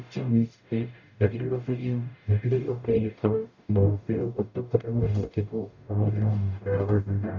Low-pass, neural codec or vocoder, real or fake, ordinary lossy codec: 7.2 kHz; codec, 44.1 kHz, 0.9 kbps, DAC; fake; none